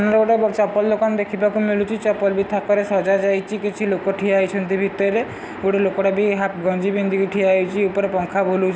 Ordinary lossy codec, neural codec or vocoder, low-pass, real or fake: none; none; none; real